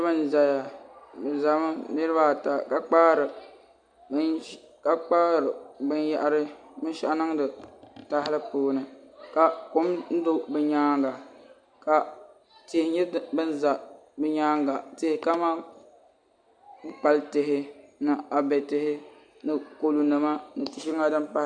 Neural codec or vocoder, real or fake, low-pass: none; real; 9.9 kHz